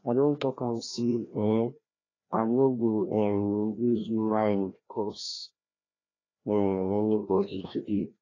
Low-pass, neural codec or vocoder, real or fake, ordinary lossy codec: 7.2 kHz; codec, 16 kHz, 1 kbps, FreqCodec, larger model; fake; AAC, 32 kbps